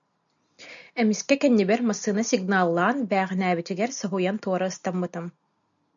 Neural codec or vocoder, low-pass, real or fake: none; 7.2 kHz; real